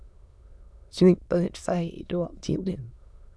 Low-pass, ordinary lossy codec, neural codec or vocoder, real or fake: none; none; autoencoder, 22.05 kHz, a latent of 192 numbers a frame, VITS, trained on many speakers; fake